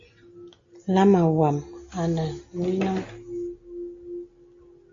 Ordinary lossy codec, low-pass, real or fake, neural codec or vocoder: AAC, 48 kbps; 7.2 kHz; real; none